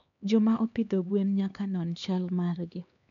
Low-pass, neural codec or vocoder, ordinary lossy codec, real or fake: 7.2 kHz; codec, 16 kHz, 2 kbps, X-Codec, HuBERT features, trained on LibriSpeech; none; fake